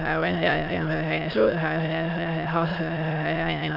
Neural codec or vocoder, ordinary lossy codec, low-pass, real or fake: autoencoder, 22.05 kHz, a latent of 192 numbers a frame, VITS, trained on many speakers; none; 5.4 kHz; fake